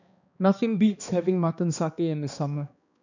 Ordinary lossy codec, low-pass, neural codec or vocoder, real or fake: none; 7.2 kHz; codec, 16 kHz, 2 kbps, X-Codec, HuBERT features, trained on balanced general audio; fake